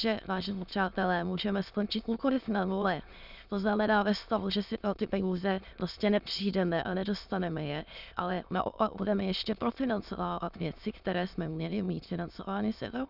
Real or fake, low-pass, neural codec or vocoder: fake; 5.4 kHz; autoencoder, 22.05 kHz, a latent of 192 numbers a frame, VITS, trained on many speakers